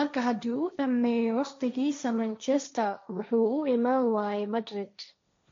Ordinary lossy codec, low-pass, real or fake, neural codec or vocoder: MP3, 48 kbps; 7.2 kHz; fake; codec, 16 kHz, 1.1 kbps, Voila-Tokenizer